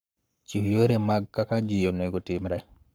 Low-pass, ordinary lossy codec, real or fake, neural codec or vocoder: none; none; fake; codec, 44.1 kHz, 7.8 kbps, Pupu-Codec